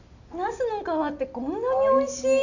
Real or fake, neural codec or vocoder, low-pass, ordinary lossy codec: real; none; 7.2 kHz; none